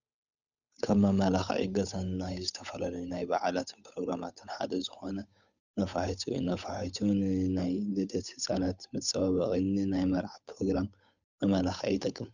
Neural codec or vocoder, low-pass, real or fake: codec, 16 kHz, 8 kbps, FunCodec, trained on Chinese and English, 25 frames a second; 7.2 kHz; fake